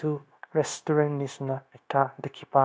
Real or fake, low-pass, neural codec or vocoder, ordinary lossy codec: fake; none; codec, 16 kHz, 0.9 kbps, LongCat-Audio-Codec; none